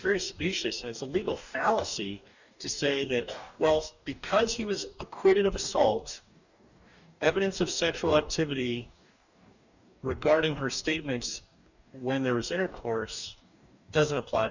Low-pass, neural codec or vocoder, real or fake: 7.2 kHz; codec, 44.1 kHz, 2.6 kbps, DAC; fake